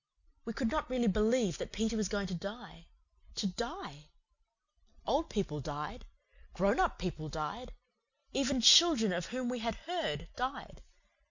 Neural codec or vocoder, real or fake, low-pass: none; real; 7.2 kHz